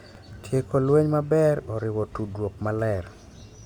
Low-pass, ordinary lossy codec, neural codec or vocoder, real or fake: 19.8 kHz; Opus, 64 kbps; none; real